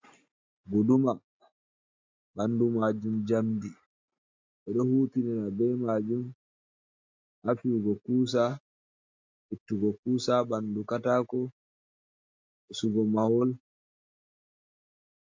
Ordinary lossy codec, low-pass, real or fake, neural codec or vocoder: MP3, 64 kbps; 7.2 kHz; real; none